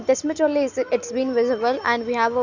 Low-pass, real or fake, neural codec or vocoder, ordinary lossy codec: 7.2 kHz; real; none; none